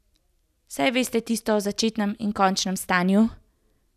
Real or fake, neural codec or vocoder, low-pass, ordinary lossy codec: real; none; 14.4 kHz; none